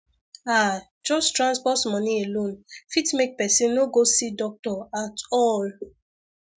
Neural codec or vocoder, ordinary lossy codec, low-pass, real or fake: none; none; none; real